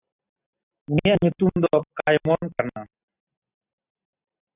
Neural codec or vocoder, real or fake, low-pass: none; real; 3.6 kHz